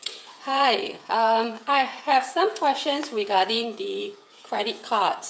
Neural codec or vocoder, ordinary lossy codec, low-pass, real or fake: codec, 16 kHz, 8 kbps, FreqCodec, smaller model; none; none; fake